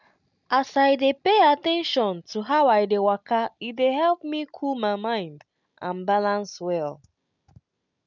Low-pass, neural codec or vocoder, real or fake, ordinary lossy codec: 7.2 kHz; none; real; none